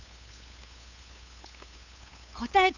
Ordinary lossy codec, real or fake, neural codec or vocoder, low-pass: none; fake; codec, 16 kHz, 8 kbps, FunCodec, trained on LibriTTS, 25 frames a second; 7.2 kHz